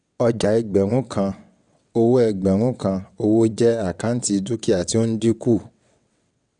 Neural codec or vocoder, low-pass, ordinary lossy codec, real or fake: vocoder, 22.05 kHz, 80 mel bands, WaveNeXt; 9.9 kHz; none; fake